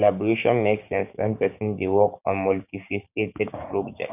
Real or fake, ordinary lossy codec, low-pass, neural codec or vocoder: fake; none; 3.6 kHz; codec, 16 kHz, 6 kbps, DAC